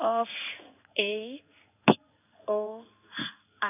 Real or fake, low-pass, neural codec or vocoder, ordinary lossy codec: fake; 3.6 kHz; codec, 16 kHz in and 24 kHz out, 1 kbps, XY-Tokenizer; none